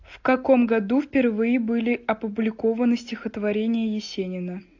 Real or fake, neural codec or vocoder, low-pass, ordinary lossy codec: real; none; 7.2 kHz; AAC, 48 kbps